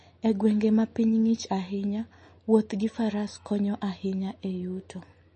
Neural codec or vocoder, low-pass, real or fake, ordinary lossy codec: none; 10.8 kHz; real; MP3, 32 kbps